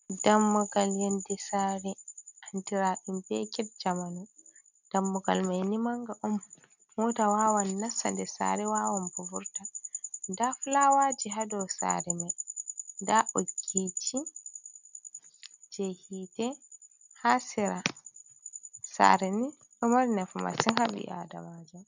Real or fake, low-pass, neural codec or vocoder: real; 7.2 kHz; none